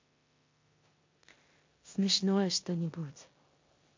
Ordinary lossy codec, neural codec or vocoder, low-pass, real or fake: MP3, 48 kbps; codec, 16 kHz in and 24 kHz out, 0.9 kbps, LongCat-Audio-Codec, four codebook decoder; 7.2 kHz; fake